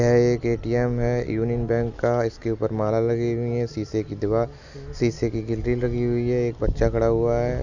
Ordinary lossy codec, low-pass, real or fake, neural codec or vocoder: none; 7.2 kHz; real; none